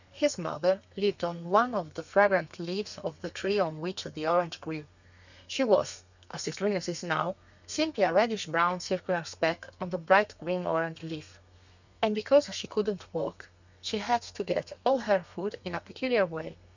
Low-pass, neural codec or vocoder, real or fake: 7.2 kHz; codec, 32 kHz, 1.9 kbps, SNAC; fake